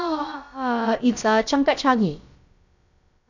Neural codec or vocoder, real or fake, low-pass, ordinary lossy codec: codec, 16 kHz, about 1 kbps, DyCAST, with the encoder's durations; fake; 7.2 kHz; none